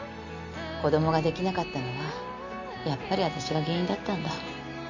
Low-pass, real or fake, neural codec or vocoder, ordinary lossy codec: 7.2 kHz; real; none; none